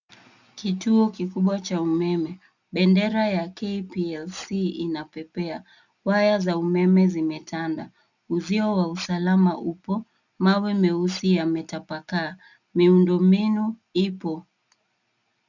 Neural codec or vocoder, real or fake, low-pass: none; real; 7.2 kHz